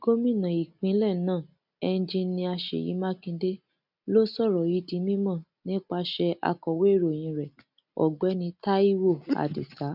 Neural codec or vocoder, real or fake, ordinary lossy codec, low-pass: none; real; none; 5.4 kHz